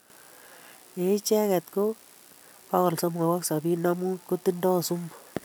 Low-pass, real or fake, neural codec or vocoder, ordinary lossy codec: none; real; none; none